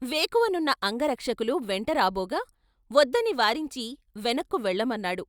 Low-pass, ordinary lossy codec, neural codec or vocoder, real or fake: 19.8 kHz; Opus, 64 kbps; none; real